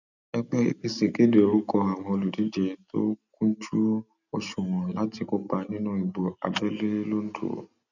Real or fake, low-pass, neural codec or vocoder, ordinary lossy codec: real; 7.2 kHz; none; none